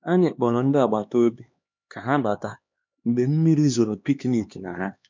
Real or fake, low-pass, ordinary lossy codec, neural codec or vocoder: fake; 7.2 kHz; MP3, 64 kbps; codec, 16 kHz, 2 kbps, X-Codec, HuBERT features, trained on LibriSpeech